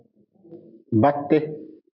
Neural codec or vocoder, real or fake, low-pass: none; real; 5.4 kHz